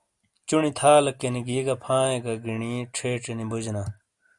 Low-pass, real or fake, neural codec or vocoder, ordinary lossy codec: 10.8 kHz; real; none; Opus, 64 kbps